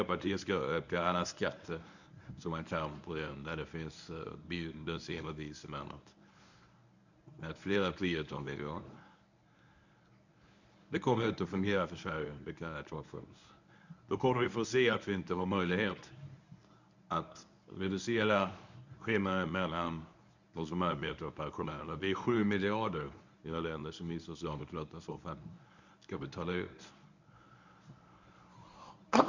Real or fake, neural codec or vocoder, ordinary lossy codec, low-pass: fake; codec, 24 kHz, 0.9 kbps, WavTokenizer, medium speech release version 1; none; 7.2 kHz